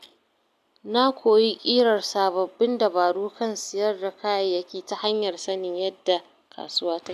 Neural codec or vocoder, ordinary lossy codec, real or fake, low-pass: none; none; real; 14.4 kHz